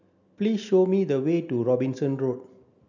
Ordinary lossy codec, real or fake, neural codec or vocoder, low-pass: none; real; none; 7.2 kHz